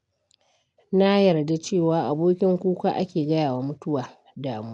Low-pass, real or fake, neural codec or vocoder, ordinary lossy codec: 10.8 kHz; real; none; none